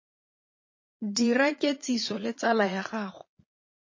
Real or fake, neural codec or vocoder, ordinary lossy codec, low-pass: fake; vocoder, 22.05 kHz, 80 mel bands, Vocos; MP3, 32 kbps; 7.2 kHz